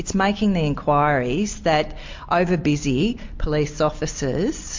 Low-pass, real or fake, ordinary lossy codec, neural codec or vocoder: 7.2 kHz; real; MP3, 48 kbps; none